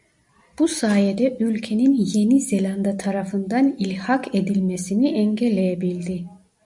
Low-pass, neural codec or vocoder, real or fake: 10.8 kHz; none; real